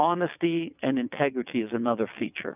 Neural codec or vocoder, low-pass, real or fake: vocoder, 22.05 kHz, 80 mel bands, WaveNeXt; 3.6 kHz; fake